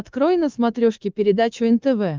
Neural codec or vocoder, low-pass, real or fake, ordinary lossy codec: codec, 24 kHz, 3.1 kbps, DualCodec; 7.2 kHz; fake; Opus, 24 kbps